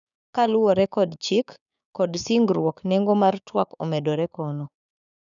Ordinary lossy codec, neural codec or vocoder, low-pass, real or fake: none; codec, 16 kHz, 6 kbps, DAC; 7.2 kHz; fake